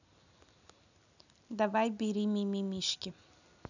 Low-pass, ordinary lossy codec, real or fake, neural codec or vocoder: 7.2 kHz; none; real; none